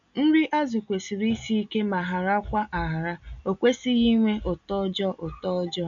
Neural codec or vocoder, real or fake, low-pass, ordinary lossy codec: none; real; 7.2 kHz; none